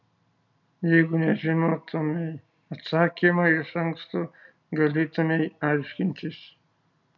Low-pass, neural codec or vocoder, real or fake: 7.2 kHz; none; real